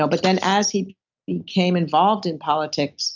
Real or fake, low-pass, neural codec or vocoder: real; 7.2 kHz; none